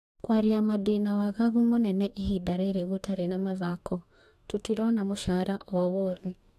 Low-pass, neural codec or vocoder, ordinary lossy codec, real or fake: 14.4 kHz; codec, 44.1 kHz, 2.6 kbps, DAC; none; fake